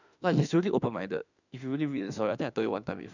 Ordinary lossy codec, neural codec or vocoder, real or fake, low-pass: none; autoencoder, 48 kHz, 32 numbers a frame, DAC-VAE, trained on Japanese speech; fake; 7.2 kHz